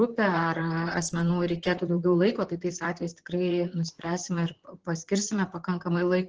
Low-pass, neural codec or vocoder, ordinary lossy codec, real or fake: 7.2 kHz; vocoder, 44.1 kHz, 128 mel bands, Pupu-Vocoder; Opus, 16 kbps; fake